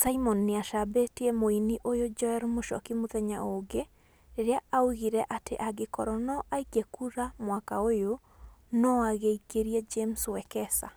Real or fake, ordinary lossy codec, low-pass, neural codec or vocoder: real; none; none; none